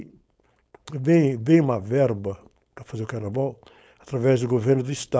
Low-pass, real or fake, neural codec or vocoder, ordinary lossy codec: none; fake; codec, 16 kHz, 4.8 kbps, FACodec; none